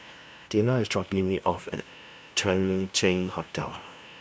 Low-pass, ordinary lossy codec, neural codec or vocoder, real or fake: none; none; codec, 16 kHz, 0.5 kbps, FunCodec, trained on LibriTTS, 25 frames a second; fake